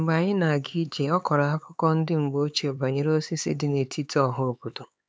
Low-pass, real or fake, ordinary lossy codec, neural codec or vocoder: none; fake; none; codec, 16 kHz, 4 kbps, X-Codec, HuBERT features, trained on LibriSpeech